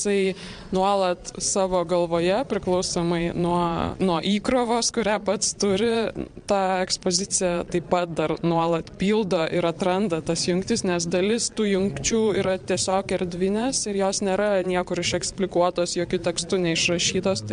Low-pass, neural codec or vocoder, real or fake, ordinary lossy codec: 9.9 kHz; vocoder, 22.05 kHz, 80 mel bands, Vocos; fake; MP3, 64 kbps